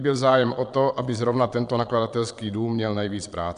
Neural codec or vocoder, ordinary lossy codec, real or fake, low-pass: vocoder, 22.05 kHz, 80 mel bands, Vocos; AAC, 96 kbps; fake; 9.9 kHz